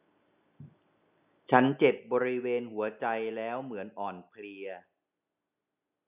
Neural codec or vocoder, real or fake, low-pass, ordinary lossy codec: none; real; 3.6 kHz; none